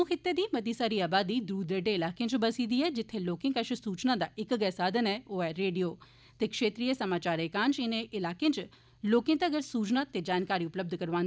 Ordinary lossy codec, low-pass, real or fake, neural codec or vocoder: none; none; real; none